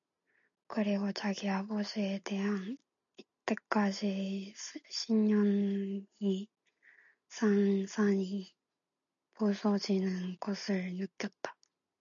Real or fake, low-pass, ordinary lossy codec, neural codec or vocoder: real; 7.2 kHz; MP3, 96 kbps; none